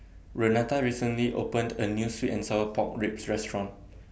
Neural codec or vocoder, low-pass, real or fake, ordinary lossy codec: none; none; real; none